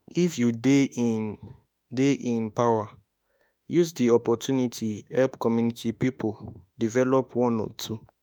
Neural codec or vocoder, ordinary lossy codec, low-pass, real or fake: autoencoder, 48 kHz, 32 numbers a frame, DAC-VAE, trained on Japanese speech; none; none; fake